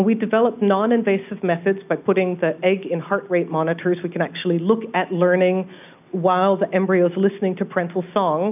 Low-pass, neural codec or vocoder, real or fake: 3.6 kHz; none; real